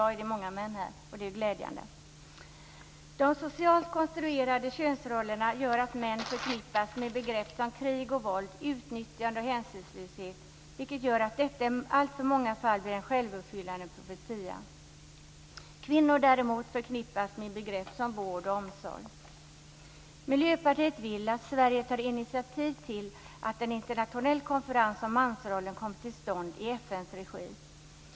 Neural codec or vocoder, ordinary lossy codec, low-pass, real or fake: none; none; none; real